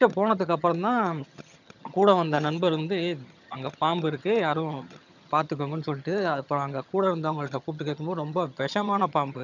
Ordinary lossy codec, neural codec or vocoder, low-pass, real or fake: none; vocoder, 22.05 kHz, 80 mel bands, HiFi-GAN; 7.2 kHz; fake